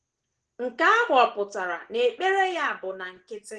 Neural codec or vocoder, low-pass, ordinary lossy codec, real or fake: none; 9.9 kHz; Opus, 16 kbps; real